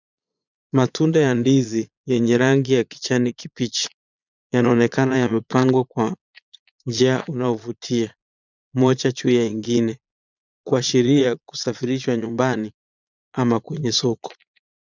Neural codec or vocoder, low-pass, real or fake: vocoder, 22.05 kHz, 80 mel bands, Vocos; 7.2 kHz; fake